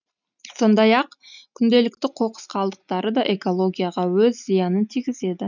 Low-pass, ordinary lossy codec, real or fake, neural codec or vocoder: 7.2 kHz; none; real; none